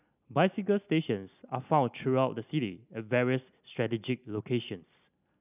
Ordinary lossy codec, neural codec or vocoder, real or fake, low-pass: none; none; real; 3.6 kHz